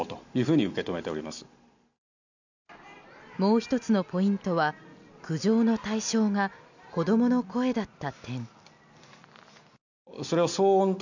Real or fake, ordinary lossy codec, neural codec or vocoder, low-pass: real; none; none; 7.2 kHz